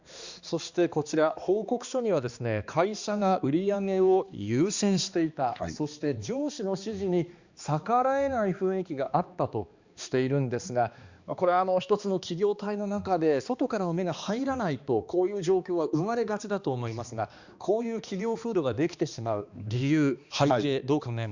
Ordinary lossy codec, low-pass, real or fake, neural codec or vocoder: Opus, 64 kbps; 7.2 kHz; fake; codec, 16 kHz, 2 kbps, X-Codec, HuBERT features, trained on balanced general audio